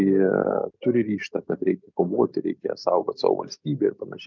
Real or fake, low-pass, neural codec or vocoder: real; 7.2 kHz; none